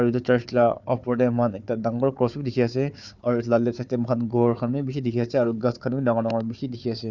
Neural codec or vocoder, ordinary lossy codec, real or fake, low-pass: codec, 16 kHz, 4 kbps, FunCodec, trained on Chinese and English, 50 frames a second; none; fake; 7.2 kHz